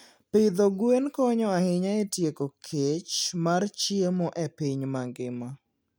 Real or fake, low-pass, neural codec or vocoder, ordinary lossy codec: real; none; none; none